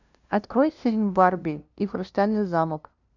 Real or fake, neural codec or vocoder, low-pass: fake; codec, 16 kHz, 0.5 kbps, FunCodec, trained on LibriTTS, 25 frames a second; 7.2 kHz